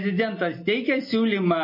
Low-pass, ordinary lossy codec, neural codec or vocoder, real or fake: 5.4 kHz; MP3, 24 kbps; none; real